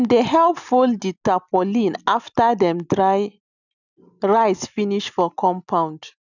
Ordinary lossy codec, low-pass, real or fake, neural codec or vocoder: none; 7.2 kHz; real; none